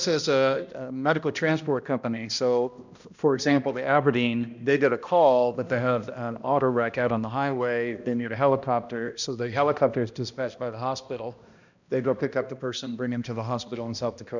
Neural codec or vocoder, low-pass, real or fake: codec, 16 kHz, 1 kbps, X-Codec, HuBERT features, trained on balanced general audio; 7.2 kHz; fake